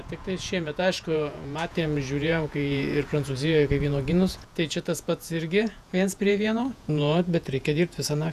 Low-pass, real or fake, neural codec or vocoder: 14.4 kHz; fake; vocoder, 48 kHz, 128 mel bands, Vocos